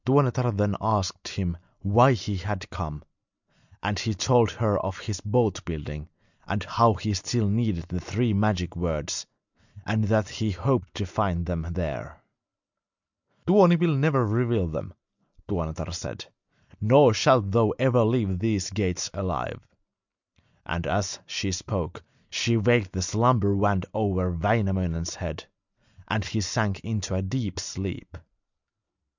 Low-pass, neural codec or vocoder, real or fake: 7.2 kHz; none; real